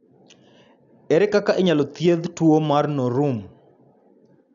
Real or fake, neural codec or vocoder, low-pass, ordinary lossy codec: real; none; 7.2 kHz; none